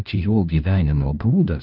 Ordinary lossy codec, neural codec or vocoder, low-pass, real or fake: Opus, 24 kbps; codec, 16 kHz, 1 kbps, FunCodec, trained on LibriTTS, 50 frames a second; 5.4 kHz; fake